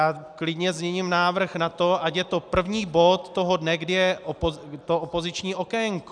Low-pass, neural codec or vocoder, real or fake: 9.9 kHz; none; real